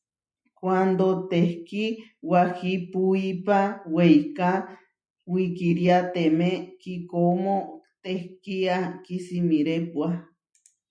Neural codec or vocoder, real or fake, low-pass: none; real; 9.9 kHz